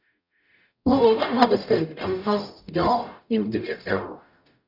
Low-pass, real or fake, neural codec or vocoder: 5.4 kHz; fake; codec, 44.1 kHz, 0.9 kbps, DAC